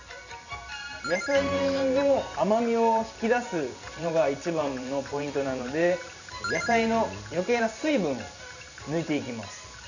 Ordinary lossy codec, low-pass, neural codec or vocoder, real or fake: none; 7.2 kHz; vocoder, 44.1 kHz, 128 mel bands every 512 samples, BigVGAN v2; fake